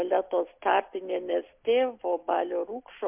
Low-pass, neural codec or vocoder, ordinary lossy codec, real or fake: 3.6 kHz; none; MP3, 32 kbps; real